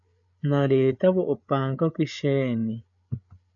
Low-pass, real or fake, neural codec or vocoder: 7.2 kHz; fake; codec, 16 kHz, 8 kbps, FreqCodec, larger model